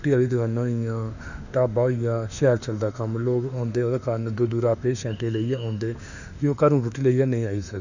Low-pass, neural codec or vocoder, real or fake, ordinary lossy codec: 7.2 kHz; autoencoder, 48 kHz, 32 numbers a frame, DAC-VAE, trained on Japanese speech; fake; none